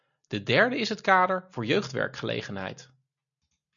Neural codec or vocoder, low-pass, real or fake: none; 7.2 kHz; real